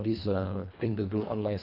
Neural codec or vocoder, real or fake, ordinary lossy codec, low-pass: codec, 24 kHz, 1.5 kbps, HILCodec; fake; none; 5.4 kHz